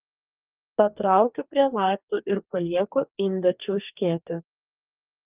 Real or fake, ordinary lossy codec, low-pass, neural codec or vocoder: fake; Opus, 24 kbps; 3.6 kHz; codec, 44.1 kHz, 2.6 kbps, DAC